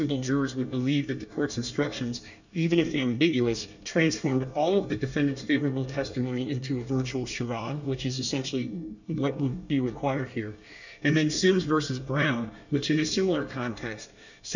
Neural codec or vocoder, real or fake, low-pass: codec, 24 kHz, 1 kbps, SNAC; fake; 7.2 kHz